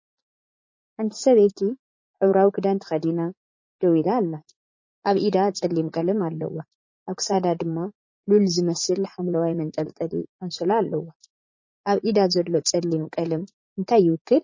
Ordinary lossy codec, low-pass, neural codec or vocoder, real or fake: MP3, 32 kbps; 7.2 kHz; vocoder, 44.1 kHz, 80 mel bands, Vocos; fake